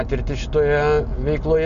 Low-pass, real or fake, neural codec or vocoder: 7.2 kHz; real; none